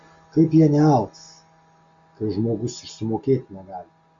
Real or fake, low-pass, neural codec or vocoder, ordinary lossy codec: real; 7.2 kHz; none; Opus, 64 kbps